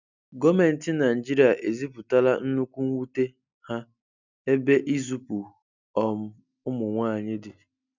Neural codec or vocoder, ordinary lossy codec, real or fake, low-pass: none; none; real; 7.2 kHz